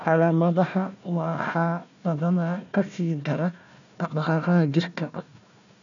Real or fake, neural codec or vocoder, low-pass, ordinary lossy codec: fake; codec, 16 kHz, 1 kbps, FunCodec, trained on Chinese and English, 50 frames a second; 7.2 kHz; none